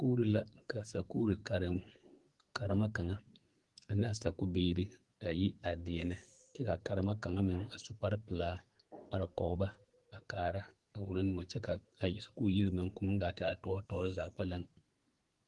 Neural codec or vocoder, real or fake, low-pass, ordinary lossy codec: codec, 44.1 kHz, 2.6 kbps, SNAC; fake; 10.8 kHz; Opus, 24 kbps